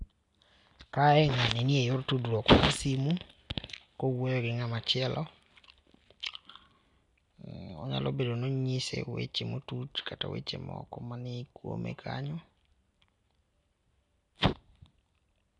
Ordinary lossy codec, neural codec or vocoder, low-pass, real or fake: none; none; 10.8 kHz; real